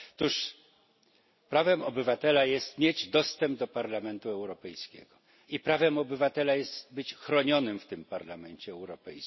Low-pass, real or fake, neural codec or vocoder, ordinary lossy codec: 7.2 kHz; real; none; MP3, 24 kbps